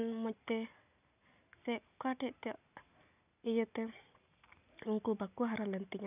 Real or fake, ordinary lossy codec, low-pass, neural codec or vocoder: real; none; 3.6 kHz; none